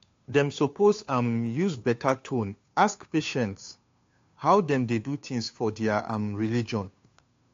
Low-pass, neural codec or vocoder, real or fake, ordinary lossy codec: 7.2 kHz; codec, 16 kHz, 2 kbps, FunCodec, trained on Chinese and English, 25 frames a second; fake; AAC, 48 kbps